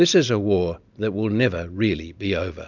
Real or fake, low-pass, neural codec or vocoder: real; 7.2 kHz; none